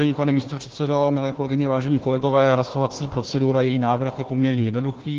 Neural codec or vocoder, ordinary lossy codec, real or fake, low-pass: codec, 16 kHz, 1 kbps, FunCodec, trained on Chinese and English, 50 frames a second; Opus, 16 kbps; fake; 7.2 kHz